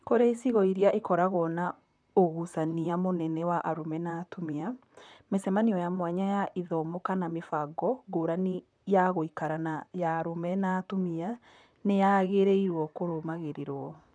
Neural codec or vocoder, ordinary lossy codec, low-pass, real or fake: vocoder, 44.1 kHz, 128 mel bands, Pupu-Vocoder; none; 9.9 kHz; fake